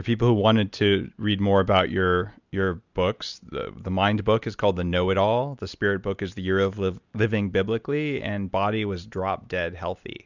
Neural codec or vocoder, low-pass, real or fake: none; 7.2 kHz; real